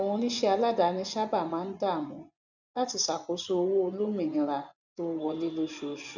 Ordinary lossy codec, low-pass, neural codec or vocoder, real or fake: none; 7.2 kHz; vocoder, 24 kHz, 100 mel bands, Vocos; fake